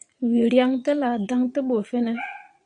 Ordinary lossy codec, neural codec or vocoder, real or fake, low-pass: AAC, 64 kbps; vocoder, 22.05 kHz, 80 mel bands, Vocos; fake; 9.9 kHz